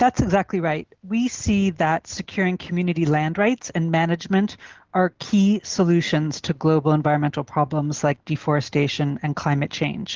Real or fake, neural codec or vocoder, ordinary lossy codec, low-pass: real; none; Opus, 16 kbps; 7.2 kHz